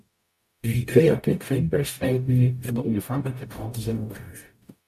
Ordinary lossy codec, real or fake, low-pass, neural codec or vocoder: AAC, 96 kbps; fake; 14.4 kHz; codec, 44.1 kHz, 0.9 kbps, DAC